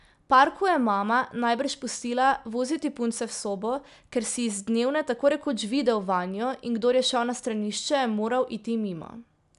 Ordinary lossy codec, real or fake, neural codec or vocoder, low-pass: none; real; none; 10.8 kHz